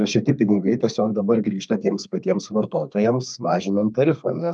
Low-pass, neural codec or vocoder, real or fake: 9.9 kHz; codec, 32 kHz, 1.9 kbps, SNAC; fake